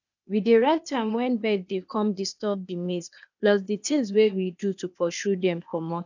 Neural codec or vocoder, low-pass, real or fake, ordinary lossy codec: codec, 16 kHz, 0.8 kbps, ZipCodec; 7.2 kHz; fake; none